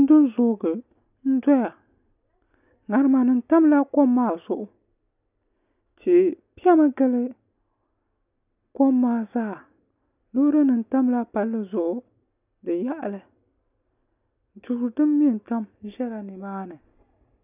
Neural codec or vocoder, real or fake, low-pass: none; real; 3.6 kHz